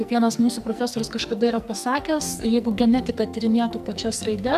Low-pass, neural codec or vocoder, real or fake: 14.4 kHz; codec, 44.1 kHz, 2.6 kbps, SNAC; fake